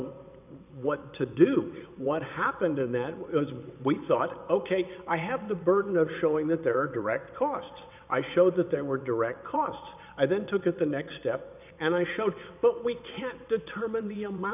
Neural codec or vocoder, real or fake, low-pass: vocoder, 22.05 kHz, 80 mel bands, WaveNeXt; fake; 3.6 kHz